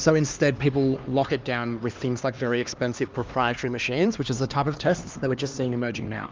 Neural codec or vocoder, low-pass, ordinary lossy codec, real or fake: codec, 16 kHz, 2 kbps, X-Codec, HuBERT features, trained on LibriSpeech; 7.2 kHz; Opus, 24 kbps; fake